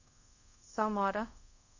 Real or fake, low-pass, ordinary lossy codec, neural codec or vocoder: fake; 7.2 kHz; AAC, 48 kbps; codec, 24 kHz, 0.5 kbps, DualCodec